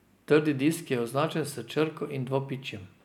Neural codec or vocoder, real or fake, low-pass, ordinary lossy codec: none; real; 19.8 kHz; none